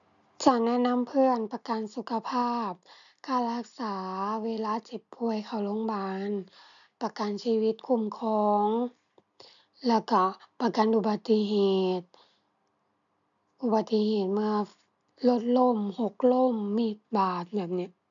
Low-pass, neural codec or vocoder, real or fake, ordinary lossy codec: 7.2 kHz; none; real; none